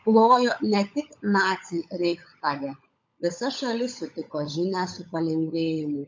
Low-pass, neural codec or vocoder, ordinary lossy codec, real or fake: 7.2 kHz; codec, 16 kHz, 16 kbps, FunCodec, trained on LibriTTS, 50 frames a second; MP3, 48 kbps; fake